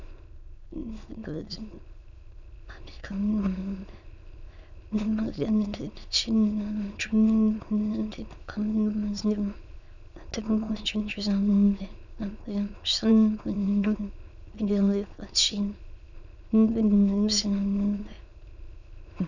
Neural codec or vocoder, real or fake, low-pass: autoencoder, 22.05 kHz, a latent of 192 numbers a frame, VITS, trained on many speakers; fake; 7.2 kHz